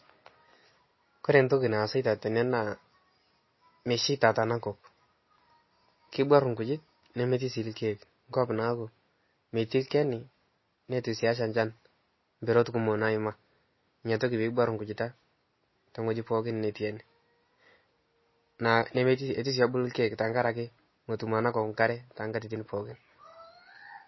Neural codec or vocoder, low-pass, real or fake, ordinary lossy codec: none; 7.2 kHz; real; MP3, 24 kbps